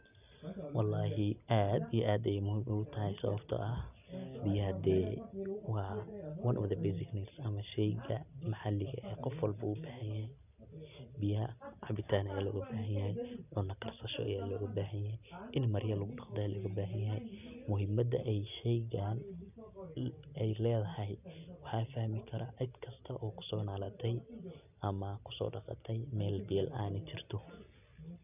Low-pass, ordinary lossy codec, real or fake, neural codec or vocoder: 3.6 kHz; none; real; none